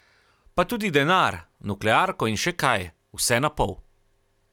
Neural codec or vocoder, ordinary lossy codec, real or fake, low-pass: none; none; real; 19.8 kHz